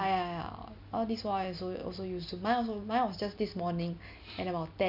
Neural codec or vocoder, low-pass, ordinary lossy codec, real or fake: none; 5.4 kHz; none; real